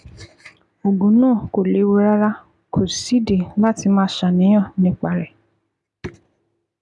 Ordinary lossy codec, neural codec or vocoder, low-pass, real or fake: none; none; 10.8 kHz; real